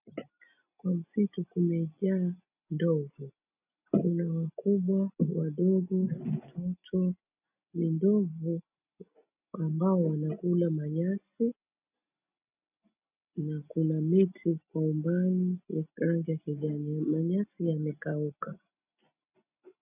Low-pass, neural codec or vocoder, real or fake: 3.6 kHz; none; real